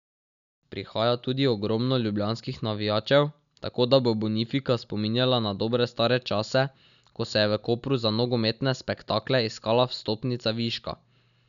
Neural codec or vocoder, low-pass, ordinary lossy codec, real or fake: none; 7.2 kHz; none; real